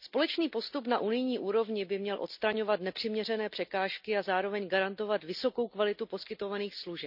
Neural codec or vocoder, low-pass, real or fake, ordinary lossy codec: none; 5.4 kHz; real; none